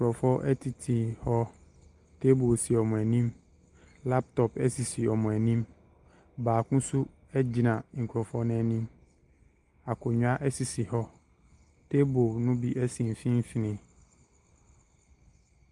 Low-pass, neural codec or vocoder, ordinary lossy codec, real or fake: 10.8 kHz; none; Opus, 32 kbps; real